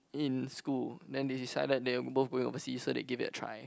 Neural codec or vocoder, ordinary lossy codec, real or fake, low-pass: none; none; real; none